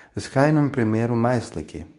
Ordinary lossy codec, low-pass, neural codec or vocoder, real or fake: MP3, 96 kbps; 10.8 kHz; codec, 24 kHz, 0.9 kbps, WavTokenizer, medium speech release version 2; fake